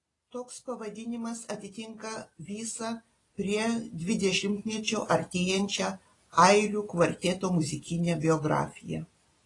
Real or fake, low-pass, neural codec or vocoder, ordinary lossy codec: real; 10.8 kHz; none; AAC, 32 kbps